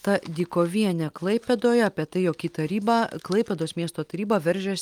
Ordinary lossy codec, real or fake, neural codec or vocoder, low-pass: Opus, 32 kbps; real; none; 19.8 kHz